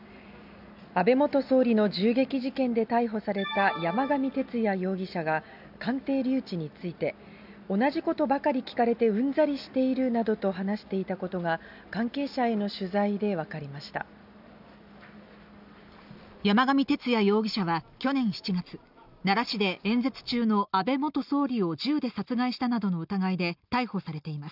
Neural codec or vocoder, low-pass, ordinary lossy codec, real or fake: none; 5.4 kHz; none; real